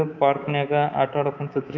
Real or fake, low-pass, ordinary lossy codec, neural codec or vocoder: fake; 7.2 kHz; none; codec, 24 kHz, 3.1 kbps, DualCodec